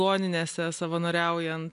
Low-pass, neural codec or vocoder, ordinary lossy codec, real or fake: 10.8 kHz; none; MP3, 96 kbps; real